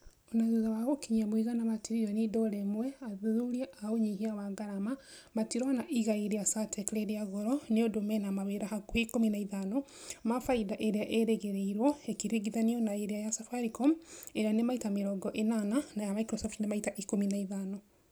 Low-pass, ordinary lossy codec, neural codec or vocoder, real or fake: none; none; none; real